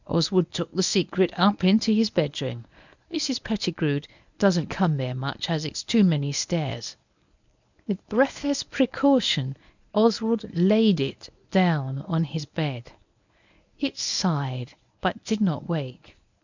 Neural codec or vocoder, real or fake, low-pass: codec, 24 kHz, 0.9 kbps, WavTokenizer, medium speech release version 1; fake; 7.2 kHz